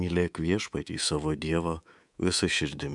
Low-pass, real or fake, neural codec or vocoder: 10.8 kHz; fake; codec, 24 kHz, 3.1 kbps, DualCodec